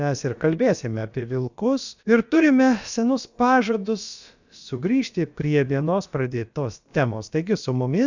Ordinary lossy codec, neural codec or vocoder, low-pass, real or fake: Opus, 64 kbps; codec, 16 kHz, about 1 kbps, DyCAST, with the encoder's durations; 7.2 kHz; fake